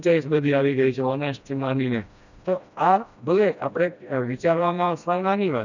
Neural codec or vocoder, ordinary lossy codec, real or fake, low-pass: codec, 16 kHz, 1 kbps, FreqCodec, smaller model; none; fake; 7.2 kHz